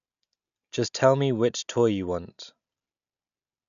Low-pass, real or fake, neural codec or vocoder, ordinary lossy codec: 7.2 kHz; real; none; none